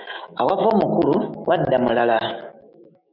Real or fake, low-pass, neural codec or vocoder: fake; 5.4 kHz; autoencoder, 48 kHz, 128 numbers a frame, DAC-VAE, trained on Japanese speech